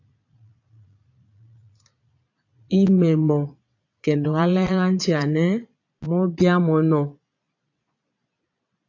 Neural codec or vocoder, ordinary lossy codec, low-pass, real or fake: vocoder, 22.05 kHz, 80 mel bands, Vocos; AAC, 48 kbps; 7.2 kHz; fake